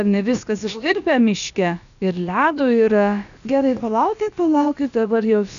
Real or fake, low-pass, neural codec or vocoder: fake; 7.2 kHz; codec, 16 kHz, about 1 kbps, DyCAST, with the encoder's durations